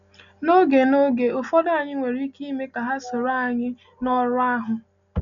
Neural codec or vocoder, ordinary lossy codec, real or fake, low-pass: none; none; real; 7.2 kHz